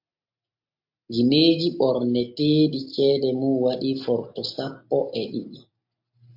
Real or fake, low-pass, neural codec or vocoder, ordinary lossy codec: real; 5.4 kHz; none; MP3, 48 kbps